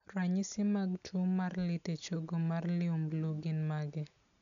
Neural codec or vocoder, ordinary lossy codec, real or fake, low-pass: none; none; real; 7.2 kHz